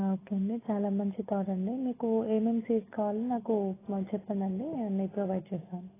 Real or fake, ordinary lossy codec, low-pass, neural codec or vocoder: real; AAC, 16 kbps; 3.6 kHz; none